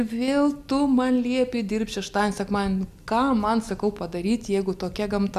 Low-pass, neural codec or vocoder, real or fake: 14.4 kHz; none; real